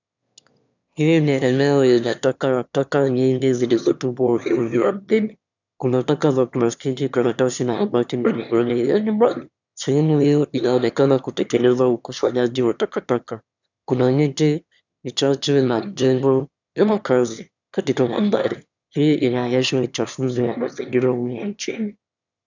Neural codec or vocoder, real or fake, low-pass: autoencoder, 22.05 kHz, a latent of 192 numbers a frame, VITS, trained on one speaker; fake; 7.2 kHz